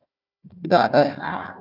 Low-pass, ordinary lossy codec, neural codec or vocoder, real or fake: 5.4 kHz; AAC, 48 kbps; codec, 16 kHz, 1 kbps, FunCodec, trained on Chinese and English, 50 frames a second; fake